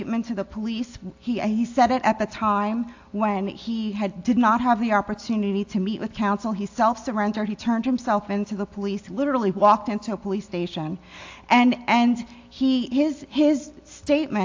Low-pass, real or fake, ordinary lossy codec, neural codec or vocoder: 7.2 kHz; real; AAC, 48 kbps; none